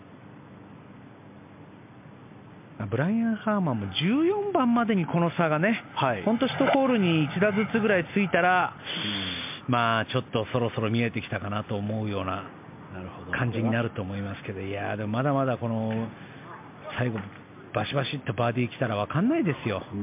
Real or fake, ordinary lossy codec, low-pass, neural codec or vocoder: real; MP3, 32 kbps; 3.6 kHz; none